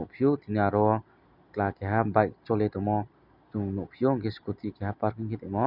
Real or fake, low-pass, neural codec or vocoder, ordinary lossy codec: real; 5.4 kHz; none; none